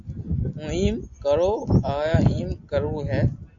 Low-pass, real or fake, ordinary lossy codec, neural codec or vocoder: 7.2 kHz; real; AAC, 64 kbps; none